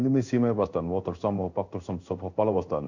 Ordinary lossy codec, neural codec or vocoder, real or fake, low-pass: none; codec, 16 kHz in and 24 kHz out, 1 kbps, XY-Tokenizer; fake; 7.2 kHz